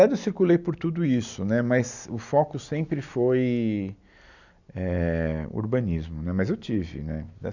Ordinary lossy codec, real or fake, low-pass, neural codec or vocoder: none; real; 7.2 kHz; none